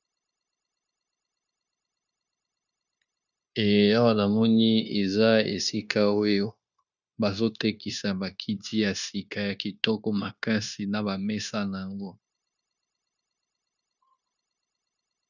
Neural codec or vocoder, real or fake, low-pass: codec, 16 kHz, 0.9 kbps, LongCat-Audio-Codec; fake; 7.2 kHz